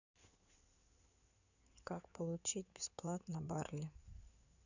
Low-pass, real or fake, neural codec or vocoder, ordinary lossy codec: 7.2 kHz; fake; codec, 16 kHz in and 24 kHz out, 2.2 kbps, FireRedTTS-2 codec; none